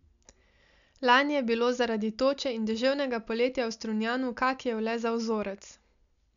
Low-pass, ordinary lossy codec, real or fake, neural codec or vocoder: 7.2 kHz; none; real; none